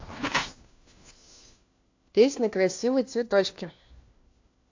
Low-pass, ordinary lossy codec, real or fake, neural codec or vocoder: 7.2 kHz; AAC, 48 kbps; fake; codec, 16 kHz, 1 kbps, FunCodec, trained on LibriTTS, 50 frames a second